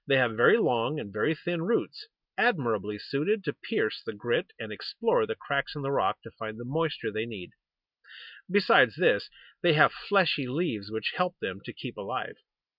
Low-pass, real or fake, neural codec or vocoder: 5.4 kHz; real; none